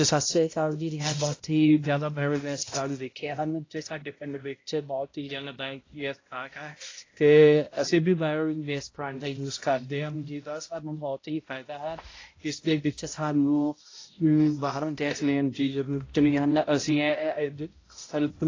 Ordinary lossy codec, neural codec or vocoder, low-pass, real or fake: AAC, 32 kbps; codec, 16 kHz, 0.5 kbps, X-Codec, HuBERT features, trained on balanced general audio; 7.2 kHz; fake